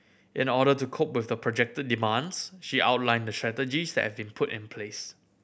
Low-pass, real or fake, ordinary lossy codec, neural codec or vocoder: none; real; none; none